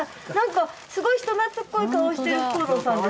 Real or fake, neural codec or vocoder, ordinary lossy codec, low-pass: real; none; none; none